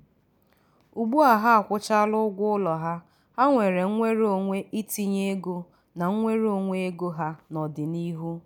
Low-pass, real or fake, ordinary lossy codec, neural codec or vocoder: 19.8 kHz; real; none; none